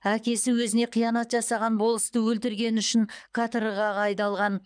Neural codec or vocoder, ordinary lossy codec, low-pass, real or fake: codec, 24 kHz, 6 kbps, HILCodec; none; 9.9 kHz; fake